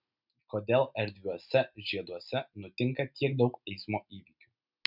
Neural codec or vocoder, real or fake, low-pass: none; real; 5.4 kHz